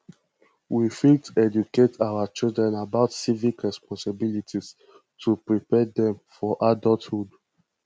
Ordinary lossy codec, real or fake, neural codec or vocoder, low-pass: none; real; none; none